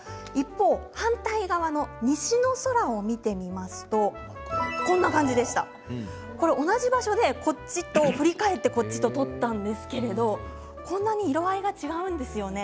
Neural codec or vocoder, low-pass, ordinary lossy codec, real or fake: none; none; none; real